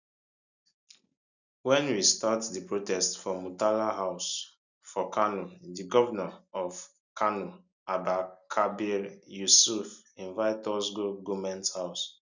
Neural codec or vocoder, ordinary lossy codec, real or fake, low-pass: none; none; real; 7.2 kHz